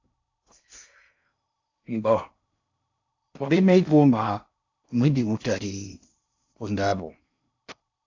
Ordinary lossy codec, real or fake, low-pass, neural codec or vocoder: AAC, 48 kbps; fake; 7.2 kHz; codec, 16 kHz in and 24 kHz out, 0.6 kbps, FocalCodec, streaming, 4096 codes